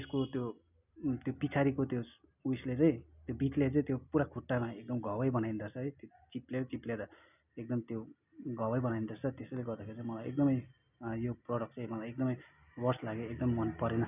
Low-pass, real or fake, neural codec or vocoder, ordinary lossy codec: 3.6 kHz; real; none; none